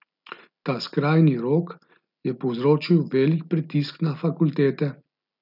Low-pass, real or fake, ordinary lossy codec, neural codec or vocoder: 5.4 kHz; real; none; none